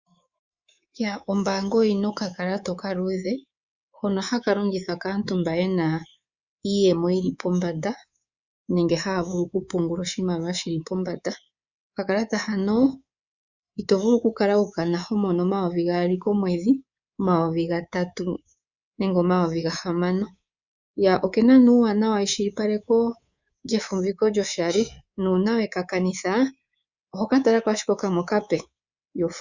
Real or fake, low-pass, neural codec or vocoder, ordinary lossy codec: fake; 7.2 kHz; codec, 24 kHz, 3.1 kbps, DualCodec; Opus, 64 kbps